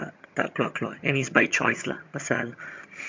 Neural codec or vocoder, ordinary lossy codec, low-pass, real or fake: vocoder, 22.05 kHz, 80 mel bands, HiFi-GAN; MP3, 48 kbps; 7.2 kHz; fake